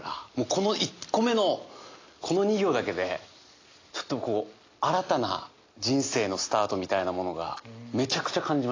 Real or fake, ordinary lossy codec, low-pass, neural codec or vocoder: real; AAC, 32 kbps; 7.2 kHz; none